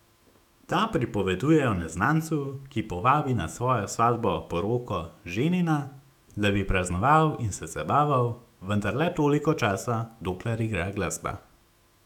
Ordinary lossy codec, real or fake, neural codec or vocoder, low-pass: none; fake; autoencoder, 48 kHz, 128 numbers a frame, DAC-VAE, trained on Japanese speech; 19.8 kHz